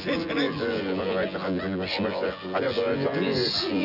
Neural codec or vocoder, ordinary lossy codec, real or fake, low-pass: vocoder, 24 kHz, 100 mel bands, Vocos; none; fake; 5.4 kHz